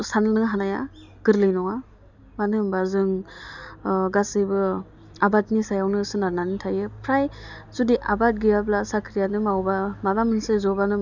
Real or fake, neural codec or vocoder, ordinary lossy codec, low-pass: real; none; none; 7.2 kHz